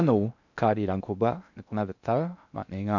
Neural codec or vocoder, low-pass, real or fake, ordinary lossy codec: codec, 16 kHz in and 24 kHz out, 0.6 kbps, FocalCodec, streaming, 4096 codes; 7.2 kHz; fake; none